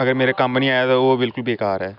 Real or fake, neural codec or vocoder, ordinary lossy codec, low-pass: real; none; none; 5.4 kHz